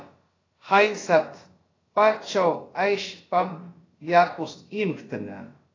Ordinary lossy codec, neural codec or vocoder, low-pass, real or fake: AAC, 32 kbps; codec, 16 kHz, about 1 kbps, DyCAST, with the encoder's durations; 7.2 kHz; fake